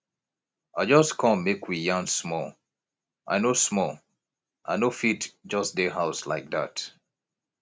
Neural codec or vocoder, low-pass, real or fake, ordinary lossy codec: none; none; real; none